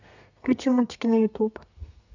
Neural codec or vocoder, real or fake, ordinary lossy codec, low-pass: codec, 32 kHz, 1.9 kbps, SNAC; fake; none; 7.2 kHz